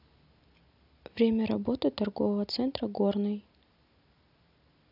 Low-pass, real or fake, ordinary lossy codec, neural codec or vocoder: 5.4 kHz; real; none; none